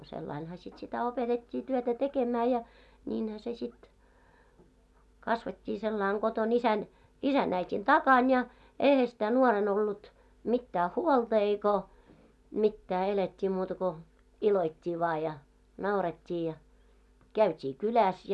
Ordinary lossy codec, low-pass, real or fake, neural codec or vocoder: none; none; real; none